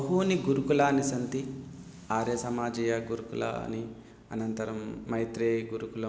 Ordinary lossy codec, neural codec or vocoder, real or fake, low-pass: none; none; real; none